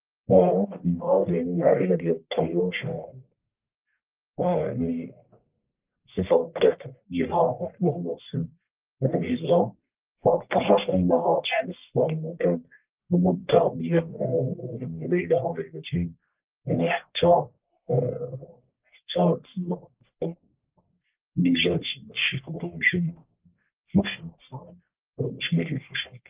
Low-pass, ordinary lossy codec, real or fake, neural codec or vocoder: 3.6 kHz; Opus, 32 kbps; fake; codec, 44.1 kHz, 1.7 kbps, Pupu-Codec